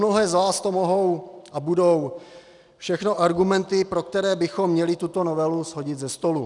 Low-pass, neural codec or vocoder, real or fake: 10.8 kHz; none; real